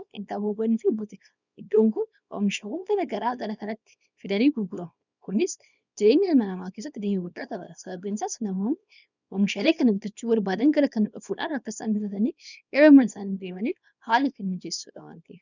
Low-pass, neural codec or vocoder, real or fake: 7.2 kHz; codec, 24 kHz, 0.9 kbps, WavTokenizer, small release; fake